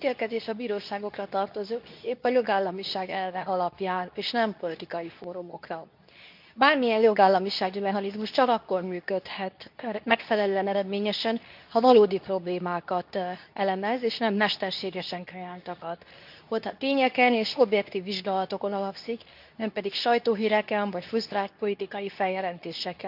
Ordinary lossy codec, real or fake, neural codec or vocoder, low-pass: none; fake; codec, 24 kHz, 0.9 kbps, WavTokenizer, medium speech release version 2; 5.4 kHz